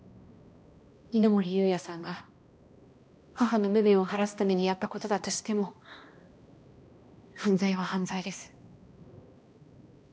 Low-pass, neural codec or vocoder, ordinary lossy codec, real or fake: none; codec, 16 kHz, 1 kbps, X-Codec, HuBERT features, trained on balanced general audio; none; fake